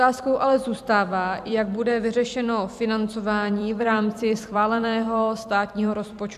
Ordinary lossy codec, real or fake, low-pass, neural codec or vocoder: AAC, 96 kbps; fake; 14.4 kHz; vocoder, 44.1 kHz, 128 mel bands every 256 samples, BigVGAN v2